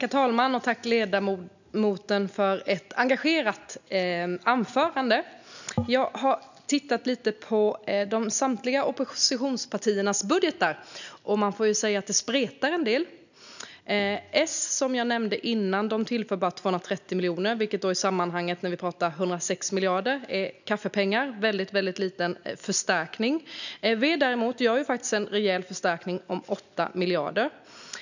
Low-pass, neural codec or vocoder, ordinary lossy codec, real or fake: 7.2 kHz; none; none; real